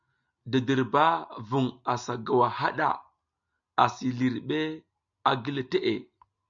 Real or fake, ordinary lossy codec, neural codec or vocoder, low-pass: real; MP3, 64 kbps; none; 7.2 kHz